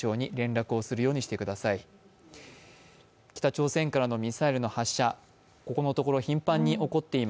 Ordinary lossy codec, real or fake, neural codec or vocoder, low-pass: none; real; none; none